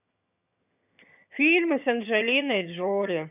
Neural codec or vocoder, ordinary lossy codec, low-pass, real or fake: vocoder, 22.05 kHz, 80 mel bands, HiFi-GAN; none; 3.6 kHz; fake